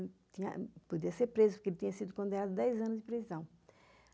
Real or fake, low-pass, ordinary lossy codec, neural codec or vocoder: real; none; none; none